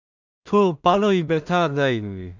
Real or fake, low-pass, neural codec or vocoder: fake; 7.2 kHz; codec, 16 kHz in and 24 kHz out, 0.4 kbps, LongCat-Audio-Codec, two codebook decoder